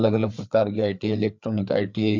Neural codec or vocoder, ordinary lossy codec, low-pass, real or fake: codec, 16 kHz, 4 kbps, FreqCodec, larger model; MP3, 64 kbps; 7.2 kHz; fake